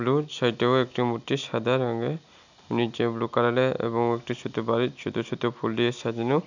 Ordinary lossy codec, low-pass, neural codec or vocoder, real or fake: none; 7.2 kHz; none; real